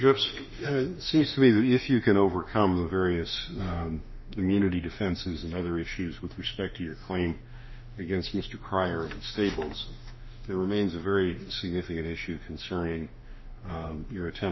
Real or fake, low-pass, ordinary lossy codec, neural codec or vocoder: fake; 7.2 kHz; MP3, 24 kbps; autoencoder, 48 kHz, 32 numbers a frame, DAC-VAE, trained on Japanese speech